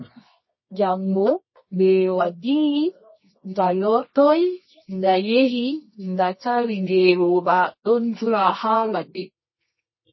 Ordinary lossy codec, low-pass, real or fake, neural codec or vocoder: MP3, 24 kbps; 7.2 kHz; fake; codec, 24 kHz, 0.9 kbps, WavTokenizer, medium music audio release